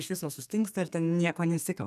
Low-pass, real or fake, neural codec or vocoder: 14.4 kHz; fake; codec, 32 kHz, 1.9 kbps, SNAC